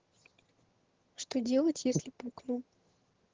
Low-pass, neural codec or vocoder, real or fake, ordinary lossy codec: 7.2 kHz; vocoder, 22.05 kHz, 80 mel bands, HiFi-GAN; fake; Opus, 16 kbps